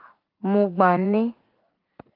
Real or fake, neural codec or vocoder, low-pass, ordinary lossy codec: fake; vocoder, 44.1 kHz, 80 mel bands, Vocos; 5.4 kHz; Opus, 32 kbps